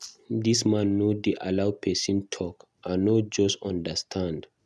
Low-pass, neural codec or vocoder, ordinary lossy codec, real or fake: none; none; none; real